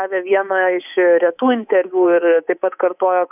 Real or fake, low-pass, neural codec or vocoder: fake; 3.6 kHz; codec, 24 kHz, 3.1 kbps, DualCodec